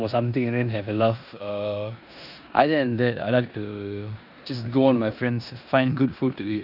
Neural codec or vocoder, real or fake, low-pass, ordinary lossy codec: codec, 16 kHz in and 24 kHz out, 0.9 kbps, LongCat-Audio-Codec, four codebook decoder; fake; 5.4 kHz; none